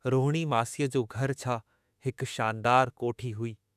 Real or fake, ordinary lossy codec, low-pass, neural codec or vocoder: fake; none; 14.4 kHz; autoencoder, 48 kHz, 32 numbers a frame, DAC-VAE, trained on Japanese speech